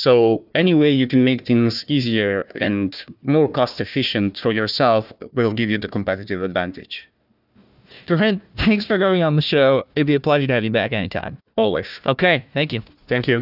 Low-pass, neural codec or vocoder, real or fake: 5.4 kHz; codec, 16 kHz, 1 kbps, FunCodec, trained on Chinese and English, 50 frames a second; fake